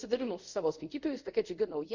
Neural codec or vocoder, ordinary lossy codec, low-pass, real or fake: codec, 24 kHz, 0.5 kbps, DualCodec; Opus, 64 kbps; 7.2 kHz; fake